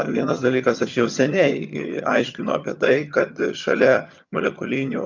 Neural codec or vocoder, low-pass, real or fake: vocoder, 22.05 kHz, 80 mel bands, HiFi-GAN; 7.2 kHz; fake